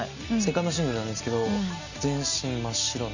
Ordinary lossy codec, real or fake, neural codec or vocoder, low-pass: none; real; none; 7.2 kHz